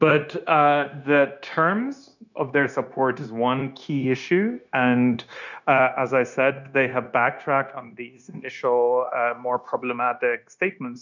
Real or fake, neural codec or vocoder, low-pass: fake; codec, 16 kHz, 0.9 kbps, LongCat-Audio-Codec; 7.2 kHz